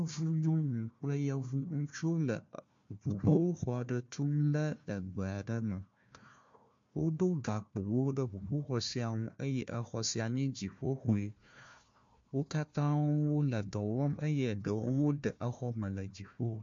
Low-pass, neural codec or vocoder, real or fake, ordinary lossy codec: 7.2 kHz; codec, 16 kHz, 1 kbps, FunCodec, trained on Chinese and English, 50 frames a second; fake; MP3, 48 kbps